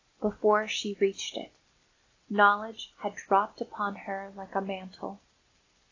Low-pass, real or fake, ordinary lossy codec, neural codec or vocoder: 7.2 kHz; real; AAC, 32 kbps; none